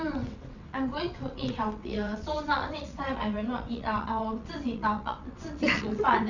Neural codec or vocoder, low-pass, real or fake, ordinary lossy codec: vocoder, 22.05 kHz, 80 mel bands, WaveNeXt; 7.2 kHz; fake; none